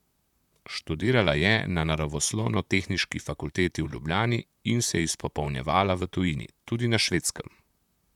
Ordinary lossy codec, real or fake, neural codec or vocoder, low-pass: none; fake; vocoder, 44.1 kHz, 128 mel bands, Pupu-Vocoder; 19.8 kHz